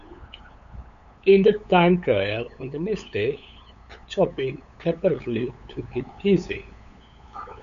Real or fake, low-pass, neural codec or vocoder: fake; 7.2 kHz; codec, 16 kHz, 8 kbps, FunCodec, trained on LibriTTS, 25 frames a second